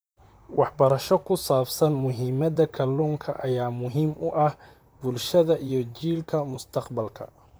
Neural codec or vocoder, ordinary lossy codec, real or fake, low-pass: vocoder, 44.1 kHz, 128 mel bands, Pupu-Vocoder; none; fake; none